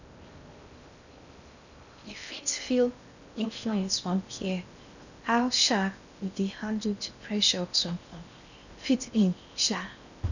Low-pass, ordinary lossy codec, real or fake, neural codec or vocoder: 7.2 kHz; none; fake; codec, 16 kHz in and 24 kHz out, 0.6 kbps, FocalCodec, streaming, 4096 codes